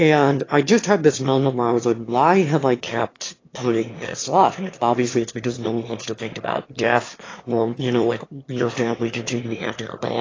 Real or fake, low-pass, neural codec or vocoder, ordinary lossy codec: fake; 7.2 kHz; autoencoder, 22.05 kHz, a latent of 192 numbers a frame, VITS, trained on one speaker; AAC, 32 kbps